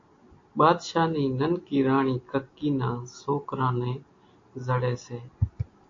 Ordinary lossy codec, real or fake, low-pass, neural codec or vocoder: AAC, 48 kbps; real; 7.2 kHz; none